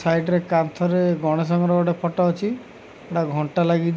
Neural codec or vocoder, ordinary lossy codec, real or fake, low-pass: none; none; real; none